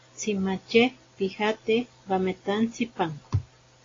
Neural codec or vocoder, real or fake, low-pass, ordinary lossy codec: none; real; 7.2 kHz; AAC, 32 kbps